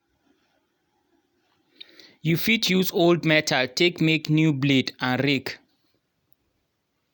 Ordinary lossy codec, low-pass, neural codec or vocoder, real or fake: none; none; none; real